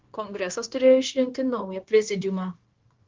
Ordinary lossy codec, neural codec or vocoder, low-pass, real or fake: Opus, 16 kbps; codec, 16 kHz, 0.9 kbps, LongCat-Audio-Codec; 7.2 kHz; fake